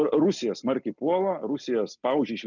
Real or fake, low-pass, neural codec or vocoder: real; 7.2 kHz; none